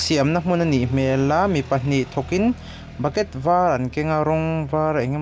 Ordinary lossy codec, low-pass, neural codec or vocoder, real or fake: none; none; none; real